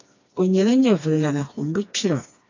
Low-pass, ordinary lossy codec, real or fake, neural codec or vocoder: 7.2 kHz; AAC, 48 kbps; fake; codec, 16 kHz, 2 kbps, FreqCodec, smaller model